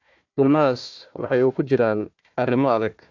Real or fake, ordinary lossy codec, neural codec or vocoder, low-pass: fake; AAC, 48 kbps; codec, 16 kHz, 1 kbps, FunCodec, trained on Chinese and English, 50 frames a second; 7.2 kHz